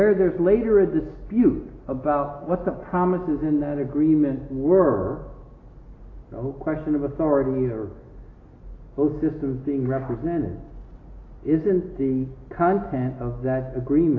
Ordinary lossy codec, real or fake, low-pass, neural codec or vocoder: AAC, 32 kbps; real; 7.2 kHz; none